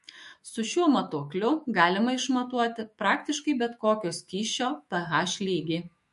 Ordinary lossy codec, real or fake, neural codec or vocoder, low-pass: MP3, 48 kbps; fake; autoencoder, 48 kHz, 128 numbers a frame, DAC-VAE, trained on Japanese speech; 14.4 kHz